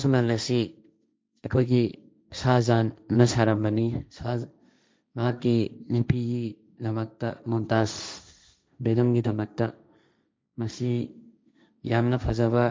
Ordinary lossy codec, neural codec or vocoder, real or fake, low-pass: none; codec, 16 kHz, 1.1 kbps, Voila-Tokenizer; fake; none